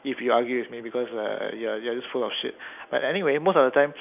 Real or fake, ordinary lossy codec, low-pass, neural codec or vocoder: real; none; 3.6 kHz; none